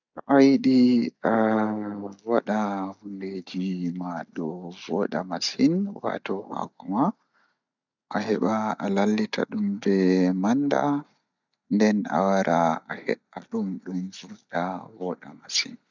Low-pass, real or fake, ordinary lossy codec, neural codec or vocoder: 7.2 kHz; real; none; none